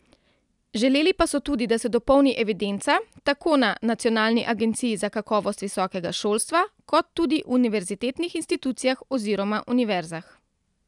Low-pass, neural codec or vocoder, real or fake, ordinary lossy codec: 10.8 kHz; vocoder, 44.1 kHz, 128 mel bands every 256 samples, BigVGAN v2; fake; none